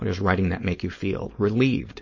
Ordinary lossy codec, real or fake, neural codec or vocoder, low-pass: MP3, 32 kbps; fake; codec, 16 kHz, 4.8 kbps, FACodec; 7.2 kHz